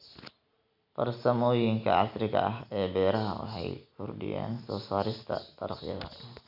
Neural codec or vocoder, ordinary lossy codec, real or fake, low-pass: none; none; real; 5.4 kHz